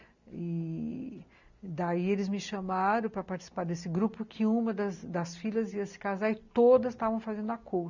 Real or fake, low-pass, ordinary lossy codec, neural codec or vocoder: real; 7.2 kHz; none; none